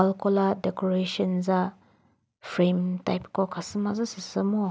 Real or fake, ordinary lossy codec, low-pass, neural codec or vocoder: real; none; none; none